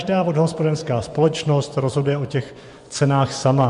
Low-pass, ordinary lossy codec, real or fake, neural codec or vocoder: 10.8 kHz; MP3, 64 kbps; fake; vocoder, 24 kHz, 100 mel bands, Vocos